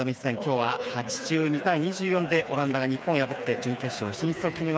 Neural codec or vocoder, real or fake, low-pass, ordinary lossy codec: codec, 16 kHz, 4 kbps, FreqCodec, smaller model; fake; none; none